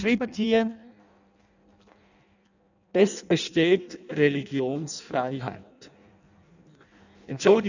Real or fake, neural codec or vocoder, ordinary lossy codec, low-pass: fake; codec, 16 kHz in and 24 kHz out, 0.6 kbps, FireRedTTS-2 codec; none; 7.2 kHz